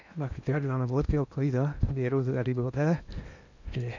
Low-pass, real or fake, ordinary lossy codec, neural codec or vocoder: 7.2 kHz; fake; none; codec, 16 kHz in and 24 kHz out, 0.8 kbps, FocalCodec, streaming, 65536 codes